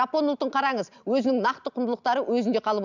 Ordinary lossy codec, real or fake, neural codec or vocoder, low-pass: none; real; none; 7.2 kHz